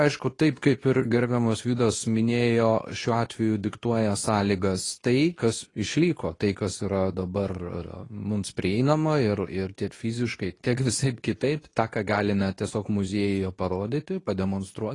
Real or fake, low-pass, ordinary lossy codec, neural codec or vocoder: fake; 10.8 kHz; AAC, 32 kbps; codec, 24 kHz, 0.9 kbps, WavTokenizer, medium speech release version 2